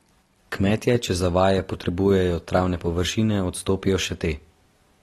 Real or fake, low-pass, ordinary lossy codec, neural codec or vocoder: real; 19.8 kHz; AAC, 32 kbps; none